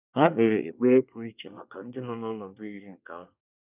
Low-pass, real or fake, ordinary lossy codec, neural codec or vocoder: 3.6 kHz; fake; none; codec, 24 kHz, 1 kbps, SNAC